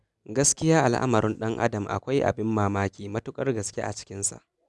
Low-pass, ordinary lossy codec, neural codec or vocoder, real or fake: none; none; none; real